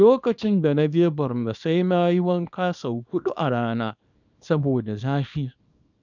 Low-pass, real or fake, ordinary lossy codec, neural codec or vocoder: 7.2 kHz; fake; none; codec, 24 kHz, 0.9 kbps, WavTokenizer, small release